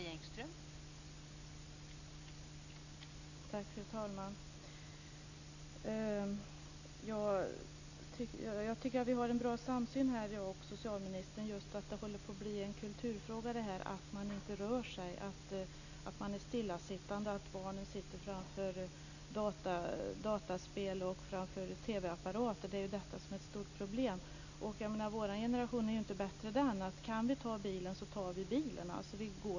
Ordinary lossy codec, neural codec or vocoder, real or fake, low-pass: none; none; real; 7.2 kHz